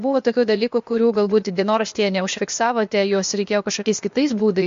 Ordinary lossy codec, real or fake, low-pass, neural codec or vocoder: MP3, 48 kbps; fake; 7.2 kHz; codec, 16 kHz, 0.8 kbps, ZipCodec